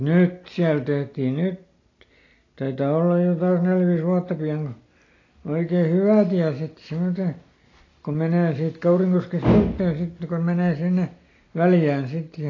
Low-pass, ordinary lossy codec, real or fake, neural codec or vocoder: 7.2 kHz; AAC, 32 kbps; real; none